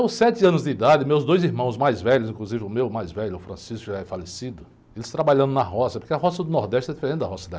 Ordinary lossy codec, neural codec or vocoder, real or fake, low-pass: none; none; real; none